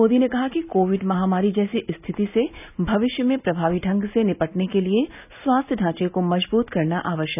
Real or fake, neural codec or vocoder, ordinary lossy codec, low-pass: real; none; none; 3.6 kHz